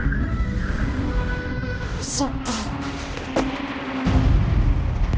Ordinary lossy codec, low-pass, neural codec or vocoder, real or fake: none; none; codec, 16 kHz, 1 kbps, X-Codec, HuBERT features, trained on balanced general audio; fake